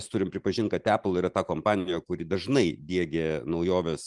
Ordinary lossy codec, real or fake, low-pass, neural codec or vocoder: Opus, 32 kbps; real; 10.8 kHz; none